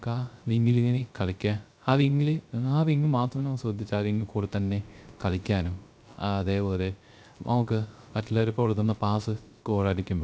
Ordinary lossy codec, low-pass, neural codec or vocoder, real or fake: none; none; codec, 16 kHz, 0.3 kbps, FocalCodec; fake